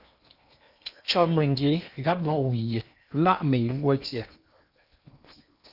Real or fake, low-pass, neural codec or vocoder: fake; 5.4 kHz; codec, 16 kHz in and 24 kHz out, 0.8 kbps, FocalCodec, streaming, 65536 codes